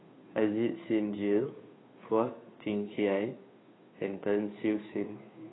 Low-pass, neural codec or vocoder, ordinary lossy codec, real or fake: 7.2 kHz; codec, 16 kHz, 2 kbps, FunCodec, trained on Chinese and English, 25 frames a second; AAC, 16 kbps; fake